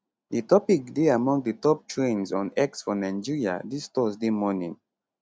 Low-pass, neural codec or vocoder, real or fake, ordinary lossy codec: none; none; real; none